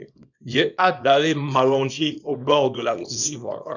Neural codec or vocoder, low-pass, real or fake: codec, 24 kHz, 0.9 kbps, WavTokenizer, small release; 7.2 kHz; fake